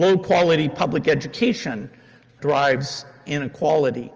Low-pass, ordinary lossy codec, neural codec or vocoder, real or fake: 7.2 kHz; Opus, 24 kbps; none; real